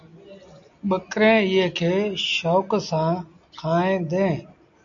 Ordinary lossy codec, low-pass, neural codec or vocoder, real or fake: MP3, 64 kbps; 7.2 kHz; none; real